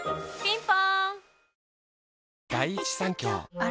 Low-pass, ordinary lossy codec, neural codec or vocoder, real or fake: none; none; none; real